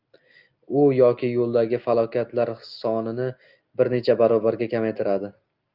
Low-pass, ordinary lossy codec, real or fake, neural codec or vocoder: 5.4 kHz; Opus, 24 kbps; real; none